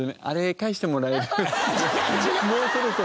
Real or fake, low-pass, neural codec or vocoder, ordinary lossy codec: real; none; none; none